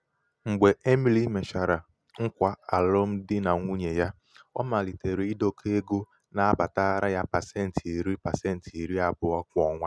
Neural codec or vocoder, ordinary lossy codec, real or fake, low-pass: none; none; real; none